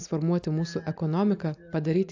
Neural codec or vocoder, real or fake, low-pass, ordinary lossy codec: none; real; 7.2 kHz; AAC, 48 kbps